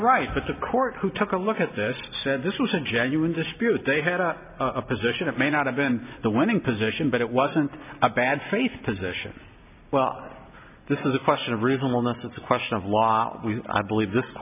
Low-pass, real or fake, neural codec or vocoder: 3.6 kHz; real; none